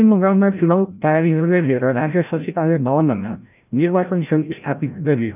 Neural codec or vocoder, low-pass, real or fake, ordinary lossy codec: codec, 16 kHz, 0.5 kbps, FreqCodec, larger model; 3.6 kHz; fake; none